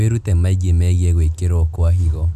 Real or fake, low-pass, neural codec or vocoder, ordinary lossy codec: real; 14.4 kHz; none; none